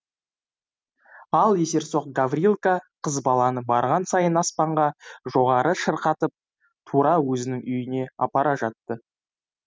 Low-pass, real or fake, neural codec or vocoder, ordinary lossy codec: none; real; none; none